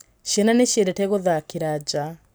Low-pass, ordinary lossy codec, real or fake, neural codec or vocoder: none; none; real; none